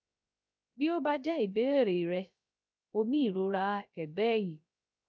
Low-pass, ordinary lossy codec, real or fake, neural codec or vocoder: none; none; fake; codec, 16 kHz, 0.3 kbps, FocalCodec